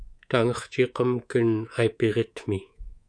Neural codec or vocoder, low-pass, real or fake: codec, 24 kHz, 3.1 kbps, DualCodec; 9.9 kHz; fake